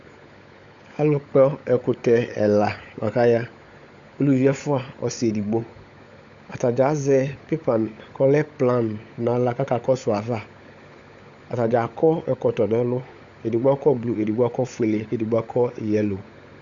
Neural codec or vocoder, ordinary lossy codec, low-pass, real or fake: codec, 16 kHz, 16 kbps, FunCodec, trained on LibriTTS, 50 frames a second; Opus, 64 kbps; 7.2 kHz; fake